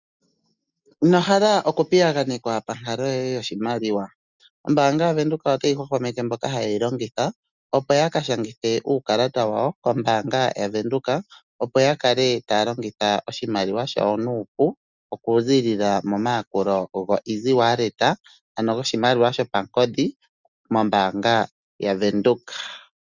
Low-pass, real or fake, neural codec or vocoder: 7.2 kHz; real; none